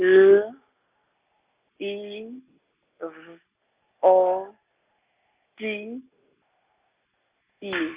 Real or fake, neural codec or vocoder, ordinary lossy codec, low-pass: real; none; Opus, 64 kbps; 3.6 kHz